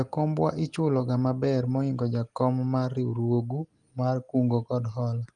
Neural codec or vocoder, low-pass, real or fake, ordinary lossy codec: none; 10.8 kHz; real; Opus, 24 kbps